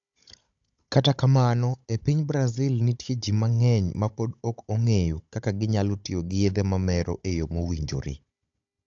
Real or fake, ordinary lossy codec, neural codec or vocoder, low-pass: fake; AAC, 64 kbps; codec, 16 kHz, 16 kbps, FunCodec, trained on Chinese and English, 50 frames a second; 7.2 kHz